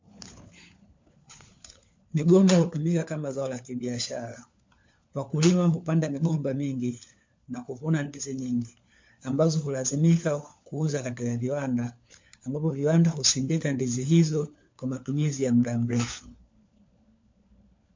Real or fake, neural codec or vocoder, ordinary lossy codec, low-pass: fake; codec, 16 kHz, 4 kbps, FunCodec, trained on LibriTTS, 50 frames a second; MP3, 48 kbps; 7.2 kHz